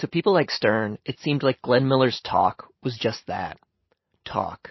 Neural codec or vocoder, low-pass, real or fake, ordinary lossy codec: none; 7.2 kHz; real; MP3, 24 kbps